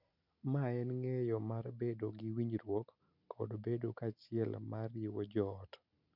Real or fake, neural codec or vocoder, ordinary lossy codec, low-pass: real; none; none; 5.4 kHz